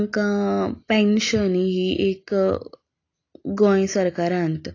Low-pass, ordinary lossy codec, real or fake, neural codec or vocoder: 7.2 kHz; AAC, 32 kbps; real; none